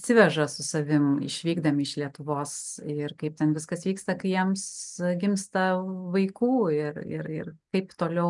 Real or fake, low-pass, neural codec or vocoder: real; 10.8 kHz; none